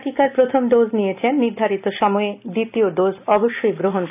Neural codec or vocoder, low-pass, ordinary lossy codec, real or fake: none; 3.6 kHz; none; real